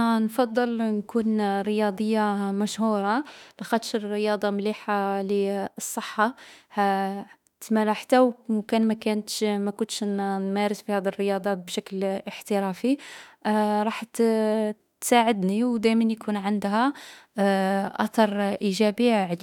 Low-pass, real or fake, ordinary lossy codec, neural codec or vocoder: 19.8 kHz; fake; none; autoencoder, 48 kHz, 32 numbers a frame, DAC-VAE, trained on Japanese speech